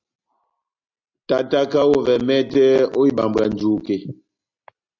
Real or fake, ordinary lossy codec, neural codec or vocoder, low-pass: real; AAC, 48 kbps; none; 7.2 kHz